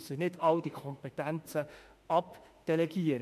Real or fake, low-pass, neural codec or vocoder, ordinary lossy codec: fake; 14.4 kHz; autoencoder, 48 kHz, 32 numbers a frame, DAC-VAE, trained on Japanese speech; MP3, 64 kbps